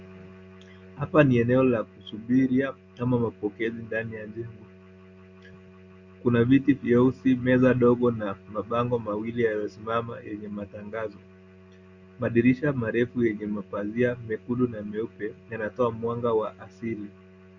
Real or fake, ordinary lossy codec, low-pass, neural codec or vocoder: real; Opus, 64 kbps; 7.2 kHz; none